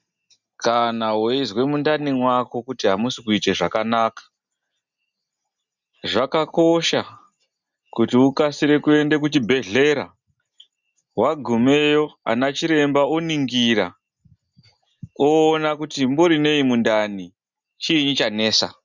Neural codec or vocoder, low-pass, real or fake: none; 7.2 kHz; real